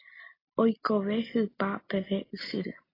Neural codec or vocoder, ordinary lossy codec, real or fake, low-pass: none; AAC, 24 kbps; real; 5.4 kHz